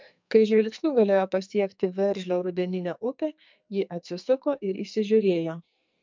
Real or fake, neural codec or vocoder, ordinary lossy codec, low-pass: fake; codec, 44.1 kHz, 2.6 kbps, SNAC; MP3, 64 kbps; 7.2 kHz